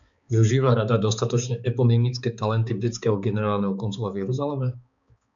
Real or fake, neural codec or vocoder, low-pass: fake; codec, 16 kHz, 4 kbps, X-Codec, HuBERT features, trained on balanced general audio; 7.2 kHz